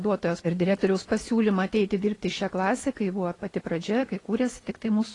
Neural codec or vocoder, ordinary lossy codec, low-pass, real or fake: none; AAC, 32 kbps; 10.8 kHz; real